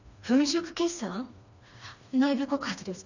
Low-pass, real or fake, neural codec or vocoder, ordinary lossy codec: 7.2 kHz; fake; codec, 16 kHz, 2 kbps, FreqCodec, smaller model; none